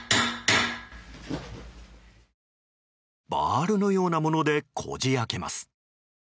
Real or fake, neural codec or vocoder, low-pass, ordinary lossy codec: real; none; none; none